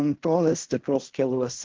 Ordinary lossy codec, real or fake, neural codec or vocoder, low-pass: Opus, 16 kbps; fake; codec, 16 kHz in and 24 kHz out, 0.4 kbps, LongCat-Audio-Codec, fine tuned four codebook decoder; 7.2 kHz